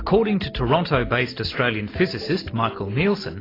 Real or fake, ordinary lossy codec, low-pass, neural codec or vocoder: real; AAC, 24 kbps; 5.4 kHz; none